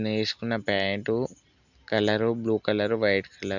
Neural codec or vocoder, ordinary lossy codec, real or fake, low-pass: none; none; real; 7.2 kHz